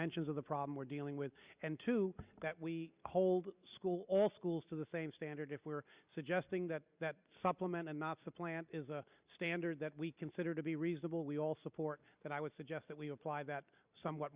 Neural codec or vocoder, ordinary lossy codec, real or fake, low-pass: vocoder, 44.1 kHz, 128 mel bands every 256 samples, BigVGAN v2; Opus, 64 kbps; fake; 3.6 kHz